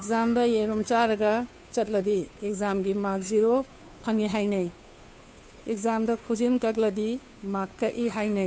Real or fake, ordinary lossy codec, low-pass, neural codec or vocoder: fake; none; none; codec, 16 kHz, 2 kbps, FunCodec, trained on Chinese and English, 25 frames a second